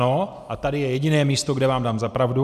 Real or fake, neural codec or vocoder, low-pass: real; none; 14.4 kHz